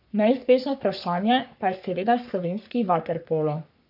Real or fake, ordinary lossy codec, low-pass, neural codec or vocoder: fake; none; 5.4 kHz; codec, 44.1 kHz, 3.4 kbps, Pupu-Codec